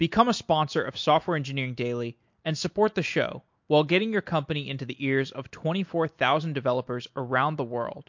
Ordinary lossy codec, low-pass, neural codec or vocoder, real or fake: MP3, 48 kbps; 7.2 kHz; none; real